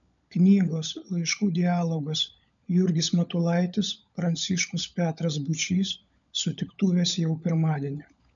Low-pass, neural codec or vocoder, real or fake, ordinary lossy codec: 7.2 kHz; codec, 16 kHz, 16 kbps, FunCodec, trained on LibriTTS, 50 frames a second; fake; MP3, 96 kbps